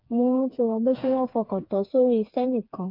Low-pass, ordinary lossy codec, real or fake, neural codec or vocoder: 5.4 kHz; AAC, 48 kbps; fake; codec, 16 kHz, 4 kbps, FreqCodec, smaller model